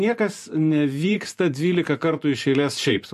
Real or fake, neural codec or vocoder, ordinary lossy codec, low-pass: fake; vocoder, 44.1 kHz, 128 mel bands every 512 samples, BigVGAN v2; MP3, 64 kbps; 14.4 kHz